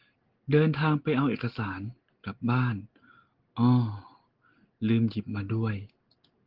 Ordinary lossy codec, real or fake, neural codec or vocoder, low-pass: Opus, 16 kbps; real; none; 5.4 kHz